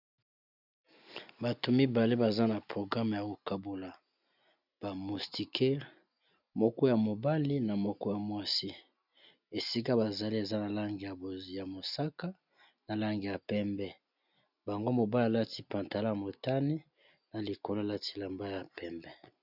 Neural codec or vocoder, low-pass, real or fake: none; 5.4 kHz; real